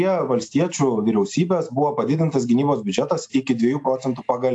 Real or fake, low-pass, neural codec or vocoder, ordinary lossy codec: real; 10.8 kHz; none; AAC, 64 kbps